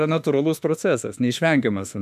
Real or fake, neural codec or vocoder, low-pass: fake; autoencoder, 48 kHz, 32 numbers a frame, DAC-VAE, trained on Japanese speech; 14.4 kHz